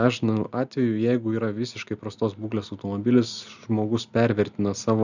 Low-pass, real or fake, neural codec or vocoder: 7.2 kHz; real; none